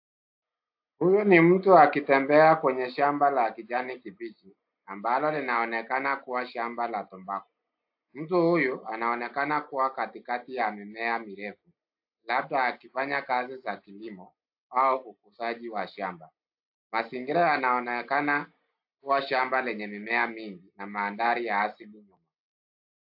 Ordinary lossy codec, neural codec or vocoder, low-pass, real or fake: AAC, 48 kbps; none; 5.4 kHz; real